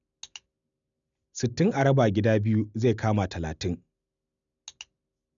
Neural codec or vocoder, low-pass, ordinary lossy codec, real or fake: none; 7.2 kHz; none; real